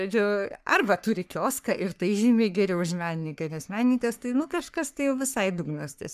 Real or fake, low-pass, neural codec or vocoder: fake; 14.4 kHz; codec, 44.1 kHz, 3.4 kbps, Pupu-Codec